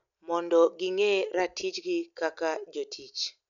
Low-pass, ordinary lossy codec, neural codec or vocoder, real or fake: 7.2 kHz; none; none; real